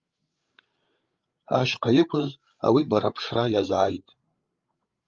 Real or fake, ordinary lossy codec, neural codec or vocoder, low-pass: fake; Opus, 32 kbps; codec, 16 kHz, 8 kbps, FreqCodec, larger model; 7.2 kHz